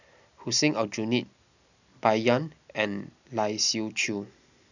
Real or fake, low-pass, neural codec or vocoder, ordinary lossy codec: real; 7.2 kHz; none; none